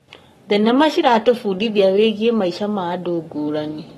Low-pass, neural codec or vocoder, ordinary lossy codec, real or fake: 19.8 kHz; codec, 44.1 kHz, 7.8 kbps, Pupu-Codec; AAC, 32 kbps; fake